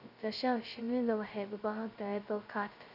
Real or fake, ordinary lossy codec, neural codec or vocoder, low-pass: fake; none; codec, 16 kHz, 0.2 kbps, FocalCodec; 5.4 kHz